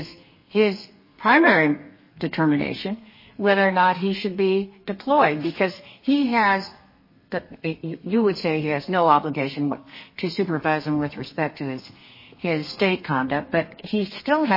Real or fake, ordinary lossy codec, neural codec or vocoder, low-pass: fake; MP3, 24 kbps; codec, 32 kHz, 1.9 kbps, SNAC; 5.4 kHz